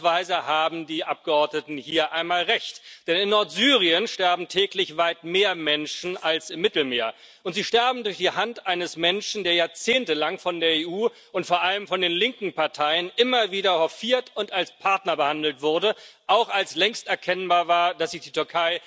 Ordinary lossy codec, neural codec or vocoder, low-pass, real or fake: none; none; none; real